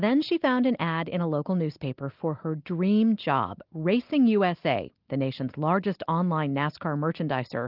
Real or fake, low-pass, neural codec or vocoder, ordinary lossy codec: real; 5.4 kHz; none; Opus, 32 kbps